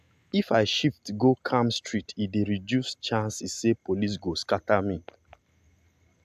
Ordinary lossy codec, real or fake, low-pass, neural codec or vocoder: AAC, 96 kbps; real; 14.4 kHz; none